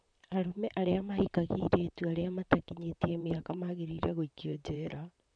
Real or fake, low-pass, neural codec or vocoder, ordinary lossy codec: fake; 9.9 kHz; vocoder, 44.1 kHz, 128 mel bands, Pupu-Vocoder; none